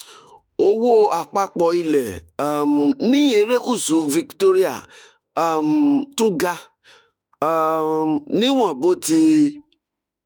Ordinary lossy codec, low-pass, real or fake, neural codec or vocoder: none; none; fake; autoencoder, 48 kHz, 32 numbers a frame, DAC-VAE, trained on Japanese speech